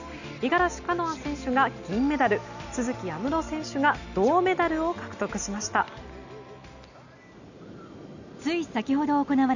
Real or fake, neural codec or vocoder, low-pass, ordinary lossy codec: real; none; 7.2 kHz; none